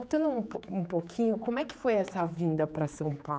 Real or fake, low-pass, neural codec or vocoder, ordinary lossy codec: fake; none; codec, 16 kHz, 4 kbps, X-Codec, HuBERT features, trained on general audio; none